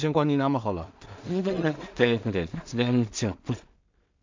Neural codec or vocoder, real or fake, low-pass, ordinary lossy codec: codec, 16 kHz in and 24 kHz out, 0.4 kbps, LongCat-Audio-Codec, two codebook decoder; fake; 7.2 kHz; MP3, 64 kbps